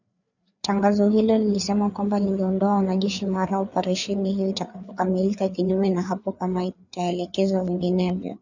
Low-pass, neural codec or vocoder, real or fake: 7.2 kHz; codec, 16 kHz, 4 kbps, FreqCodec, larger model; fake